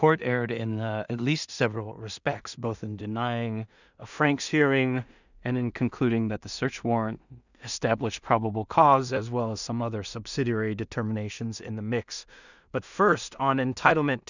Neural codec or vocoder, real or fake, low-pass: codec, 16 kHz in and 24 kHz out, 0.4 kbps, LongCat-Audio-Codec, two codebook decoder; fake; 7.2 kHz